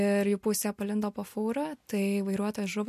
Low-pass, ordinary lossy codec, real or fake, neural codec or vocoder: 14.4 kHz; MP3, 64 kbps; real; none